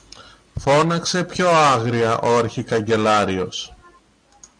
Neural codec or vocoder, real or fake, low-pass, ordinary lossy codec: none; real; 9.9 kHz; MP3, 96 kbps